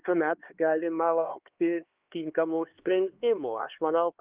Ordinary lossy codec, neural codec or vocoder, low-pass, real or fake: Opus, 32 kbps; codec, 16 kHz, 2 kbps, X-Codec, HuBERT features, trained on LibriSpeech; 3.6 kHz; fake